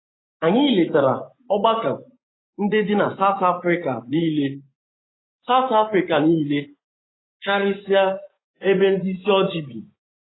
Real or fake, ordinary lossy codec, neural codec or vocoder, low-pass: fake; AAC, 16 kbps; vocoder, 24 kHz, 100 mel bands, Vocos; 7.2 kHz